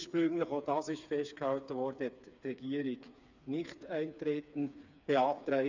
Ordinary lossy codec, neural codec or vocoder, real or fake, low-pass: none; codec, 16 kHz, 4 kbps, FreqCodec, smaller model; fake; 7.2 kHz